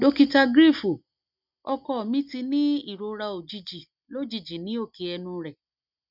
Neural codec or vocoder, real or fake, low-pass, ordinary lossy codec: none; real; 5.4 kHz; none